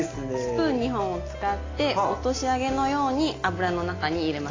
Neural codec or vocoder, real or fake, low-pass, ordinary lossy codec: none; real; 7.2 kHz; AAC, 32 kbps